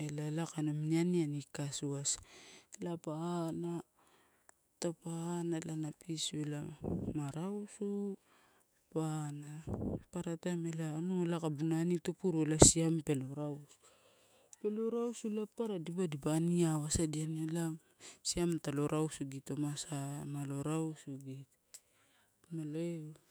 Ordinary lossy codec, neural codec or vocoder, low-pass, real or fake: none; autoencoder, 48 kHz, 128 numbers a frame, DAC-VAE, trained on Japanese speech; none; fake